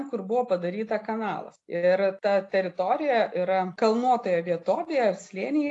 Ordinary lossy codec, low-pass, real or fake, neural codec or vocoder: AAC, 48 kbps; 10.8 kHz; real; none